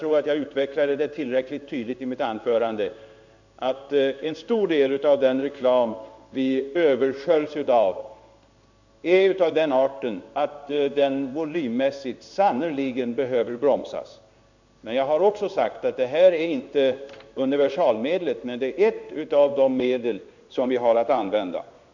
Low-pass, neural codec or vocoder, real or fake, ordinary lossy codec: 7.2 kHz; codec, 16 kHz in and 24 kHz out, 1 kbps, XY-Tokenizer; fake; none